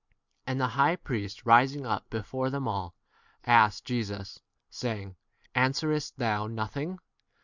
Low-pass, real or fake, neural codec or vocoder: 7.2 kHz; real; none